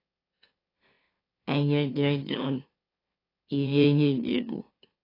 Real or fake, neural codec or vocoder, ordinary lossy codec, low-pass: fake; autoencoder, 44.1 kHz, a latent of 192 numbers a frame, MeloTTS; AAC, 24 kbps; 5.4 kHz